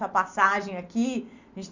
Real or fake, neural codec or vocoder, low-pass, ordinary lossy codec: real; none; 7.2 kHz; none